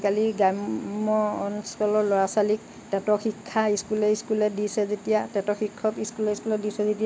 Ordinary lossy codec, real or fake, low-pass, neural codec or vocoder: none; real; none; none